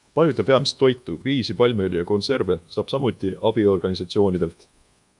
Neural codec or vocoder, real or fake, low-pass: codec, 24 kHz, 1.2 kbps, DualCodec; fake; 10.8 kHz